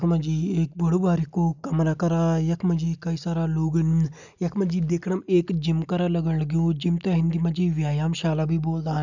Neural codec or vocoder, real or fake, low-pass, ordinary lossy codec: none; real; 7.2 kHz; none